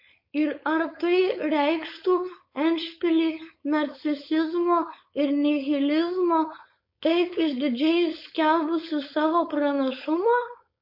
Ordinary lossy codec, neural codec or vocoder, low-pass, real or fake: MP3, 32 kbps; codec, 16 kHz, 4.8 kbps, FACodec; 5.4 kHz; fake